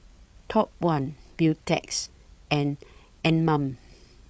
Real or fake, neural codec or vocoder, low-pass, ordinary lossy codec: fake; codec, 16 kHz, 8 kbps, FreqCodec, larger model; none; none